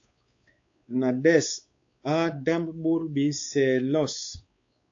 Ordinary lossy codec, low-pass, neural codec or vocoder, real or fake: AAC, 48 kbps; 7.2 kHz; codec, 16 kHz, 4 kbps, X-Codec, WavLM features, trained on Multilingual LibriSpeech; fake